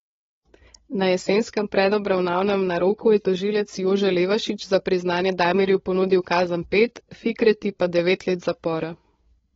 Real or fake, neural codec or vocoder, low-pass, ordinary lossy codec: fake; codec, 16 kHz, 8 kbps, FreqCodec, larger model; 7.2 kHz; AAC, 24 kbps